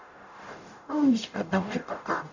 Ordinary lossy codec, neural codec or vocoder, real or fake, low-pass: none; codec, 44.1 kHz, 0.9 kbps, DAC; fake; 7.2 kHz